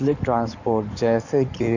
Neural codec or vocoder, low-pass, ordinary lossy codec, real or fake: codec, 16 kHz in and 24 kHz out, 2.2 kbps, FireRedTTS-2 codec; 7.2 kHz; none; fake